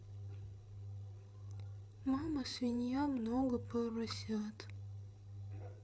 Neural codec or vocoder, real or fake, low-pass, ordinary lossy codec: codec, 16 kHz, 8 kbps, FreqCodec, larger model; fake; none; none